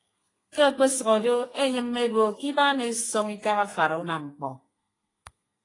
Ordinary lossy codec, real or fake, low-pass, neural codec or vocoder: AAC, 32 kbps; fake; 10.8 kHz; codec, 32 kHz, 1.9 kbps, SNAC